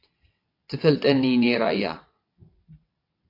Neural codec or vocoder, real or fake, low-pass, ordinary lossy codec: vocoder, 22.05 kHz, 80 mel bands, WaveNeXt; fake; 5.4 kHz; AAC, 32 kbps